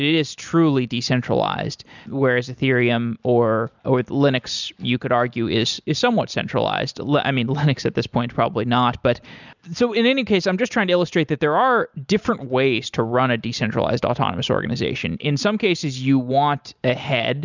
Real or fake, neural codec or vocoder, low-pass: real; none; 7.2 kHz